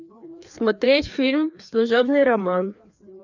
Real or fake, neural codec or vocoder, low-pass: fake; codec, 16 kHz, 2 kbps, FreqCodec, larger model; 7.2 kHz